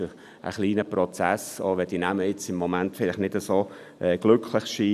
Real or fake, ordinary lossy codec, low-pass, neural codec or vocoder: real; none; 14.4 kHz; none